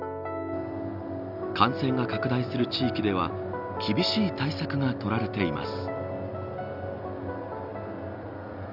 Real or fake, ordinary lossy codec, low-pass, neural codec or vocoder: real; none; 5.4 kHz; none